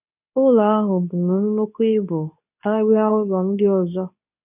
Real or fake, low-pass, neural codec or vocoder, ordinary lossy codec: fake; 3.6 kHz; codec, 24 kHz, 0.9 kbps, WavTokenizer, medium speech release version 2; none